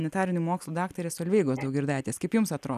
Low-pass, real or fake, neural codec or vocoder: 14.4 kHz; real; none